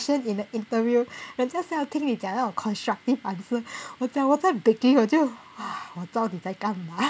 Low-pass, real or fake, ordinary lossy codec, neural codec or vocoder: none; real; none; none